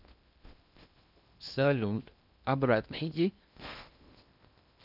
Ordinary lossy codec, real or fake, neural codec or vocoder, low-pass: none; fake; codec, 16 kHz in and 24 kHz out, 0.8 kbps, FocalCodec, streaming, 65536 codes; 5.4 kHz